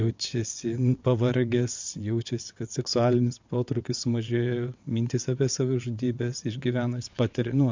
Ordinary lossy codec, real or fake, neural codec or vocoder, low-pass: MP3, 64 kbps; fake; vocoder, 22.05 kHz, 80 mel bands, WaveNeXt; 7.2 kHz